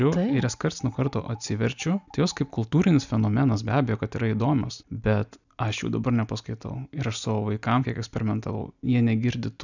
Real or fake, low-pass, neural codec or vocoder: real; 7.2 kHz; none